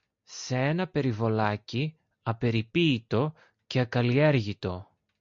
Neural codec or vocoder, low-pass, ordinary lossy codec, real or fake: none; 7.2 kHz; MP3, 48 kbps; real